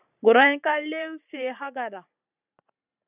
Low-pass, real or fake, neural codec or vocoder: 3.6 kHz; fake; vocoder, 44.1 kHz, 128 mel bands every 512 samples, BigVGAN v2